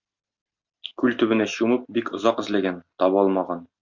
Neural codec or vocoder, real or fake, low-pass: none; real; 7.2 kHz